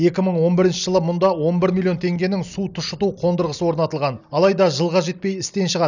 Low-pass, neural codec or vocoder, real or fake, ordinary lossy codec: 7.2 kHz; none; real; none